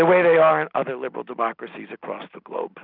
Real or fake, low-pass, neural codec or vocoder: real; 5.4 kHz; none